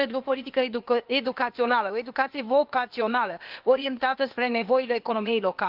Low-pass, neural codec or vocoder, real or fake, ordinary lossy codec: 5.4 kHz; codec, 16 kHz, 0.8 kbps, ZipCodec; fake; Opus, 32 kbps